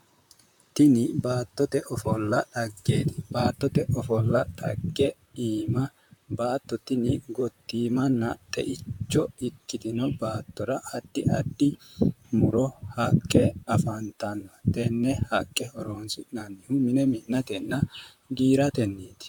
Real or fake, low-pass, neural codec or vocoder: fake; 19.8 kHz; vocoder, 44.1 kHz, 128 mel bands, Pupu-Vocoder